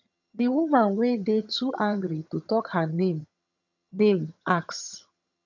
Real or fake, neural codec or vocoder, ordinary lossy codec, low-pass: fake; vocoder, 22.05 kHz, 80 mel bands, HiFi-GAN; none; 7.2 kHz